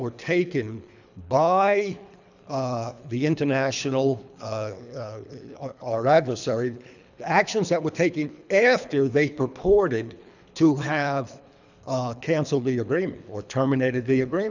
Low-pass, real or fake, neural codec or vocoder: 7.2 kHz; fake; codec, 24 kHz, 3 kbps, HILCodec